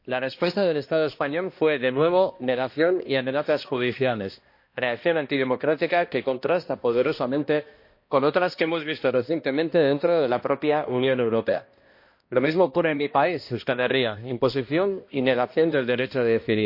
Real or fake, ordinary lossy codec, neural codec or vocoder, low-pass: fake; MP3, 32 kbps; codec, 16 kHz, 1 kbps, X-Codec, HuBERT features, trained on balanced general audio; 5.4 kHz